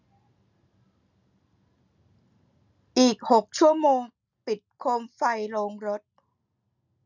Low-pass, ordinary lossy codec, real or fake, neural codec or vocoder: 7.2 kHz; none; real; none